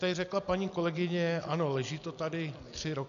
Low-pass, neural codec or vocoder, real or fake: 7.2 kHz; none; real